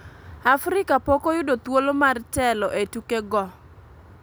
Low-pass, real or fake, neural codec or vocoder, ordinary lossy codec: none; real; none; none